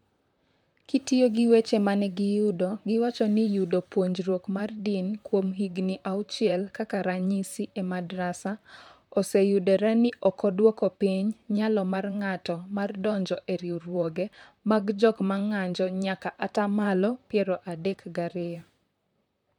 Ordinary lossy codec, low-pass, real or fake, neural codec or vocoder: MP3, 96 kbps; 19.8 kHz; fake; vocoder, 44.1 kHz, 128 mel bands, Pupu-Vocoder